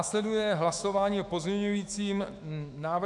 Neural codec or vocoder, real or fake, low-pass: autoencoder, 48 kHz, 128 numbers a frame, DAC-VAE, trained on Japanese speech; fake; 10.8 kHz